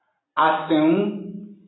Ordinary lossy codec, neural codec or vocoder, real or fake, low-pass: AAC, 16 kbps; none; real; 7.2 kHz